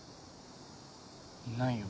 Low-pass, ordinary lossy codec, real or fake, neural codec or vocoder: none; none; real; none